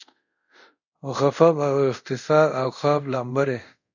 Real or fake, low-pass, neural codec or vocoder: fake; 7.2 kHz; codec, 24 kHz, 0.5 kbps, DualCodec